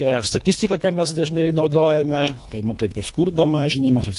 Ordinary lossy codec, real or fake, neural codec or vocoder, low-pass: AAC, 64 kbps; fake; codec, 24 kHz, 1.5 kbps, HILCodec; 10.8 kHz